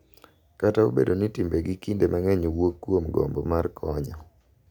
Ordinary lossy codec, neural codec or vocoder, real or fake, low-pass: none; none; real; 19.8 kHz